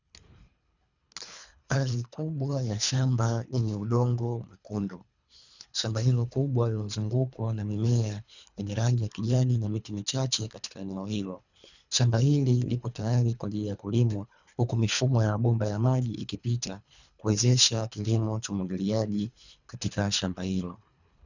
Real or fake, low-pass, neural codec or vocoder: fake; 7.2 kHz; codec, 24 kHz, 3 kbps, HILCodec